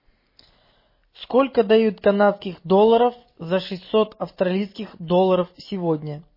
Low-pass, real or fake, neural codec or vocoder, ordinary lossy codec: 5.4 kHz; real; none; MP3, 24 kbps